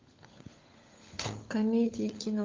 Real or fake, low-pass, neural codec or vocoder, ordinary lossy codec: fake; 7.2 kHz; codec, 16 kHz, 16 kbps, FunCodec, trained on LibriTTS, 50 frames a second; Opus, 24 kbps